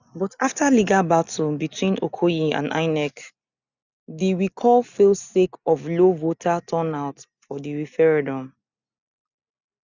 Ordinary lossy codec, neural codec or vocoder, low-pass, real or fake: none; none; 7.2 kHz; real